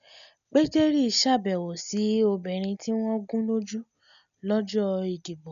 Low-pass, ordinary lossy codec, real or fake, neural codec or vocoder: 7.2 kHz; none; real; none